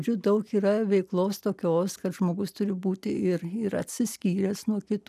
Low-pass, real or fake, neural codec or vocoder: 14.4 kHz; real; none